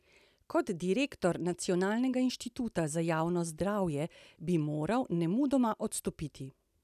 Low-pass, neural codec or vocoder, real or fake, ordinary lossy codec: 14.4 kHz; none; real; none